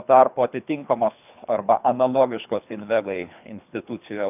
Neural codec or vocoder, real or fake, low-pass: codec, 24 kHz, 3 kbps, HILCodec; fake; 3.6 kHz